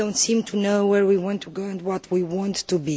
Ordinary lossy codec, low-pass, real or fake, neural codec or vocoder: none; none; real; none